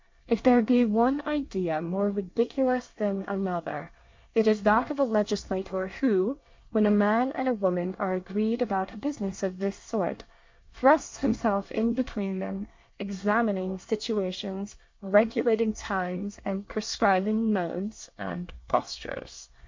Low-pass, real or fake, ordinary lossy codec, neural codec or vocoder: 7.2 kHz; fake; MP3, 48 kbps; codec, 24 kHz, 1 kbps, SNAC